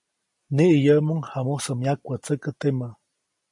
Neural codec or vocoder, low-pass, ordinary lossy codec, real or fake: none; 10.8 kHz; MP3, 48 kbps; real